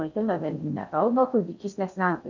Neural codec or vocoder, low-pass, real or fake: codec, 16 kHz in and 24 kHz out, 0.6 kbps, FocalCodec, streaming, 4096 codes; 7.2 kHz; fake